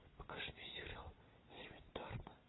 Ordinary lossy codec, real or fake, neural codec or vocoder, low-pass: AAC, 16 kbps; real; none; 7.2 kHz